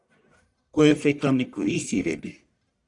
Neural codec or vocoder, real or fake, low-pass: codec, 44.1 kHz, 1.7 kbps, Pupu-Codec; fake; 10.8 kHz